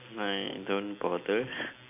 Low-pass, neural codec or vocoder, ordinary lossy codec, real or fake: 3.6 kHz; none; none; real